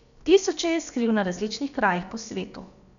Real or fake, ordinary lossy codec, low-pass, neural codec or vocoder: fake; none; 7.2 kHz; codec, 16 kHz, about 1 kbps, DyCAST, with the encoder's durations